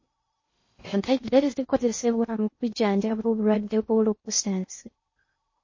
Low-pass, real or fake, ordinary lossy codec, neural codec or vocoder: 7.2 kHz; fake; MP3, 32 kbps; codec, 16 kHz in and 24 kHz out, 0.6 kbps, FocalCodec, streaming, 2048 codes